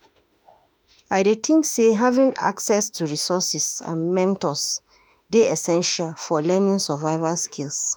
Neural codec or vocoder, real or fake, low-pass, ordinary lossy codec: autoencoder, 48 kHz, 32 numbers a frame, DAC-VAE, trained on Japanese speech; fake; none; none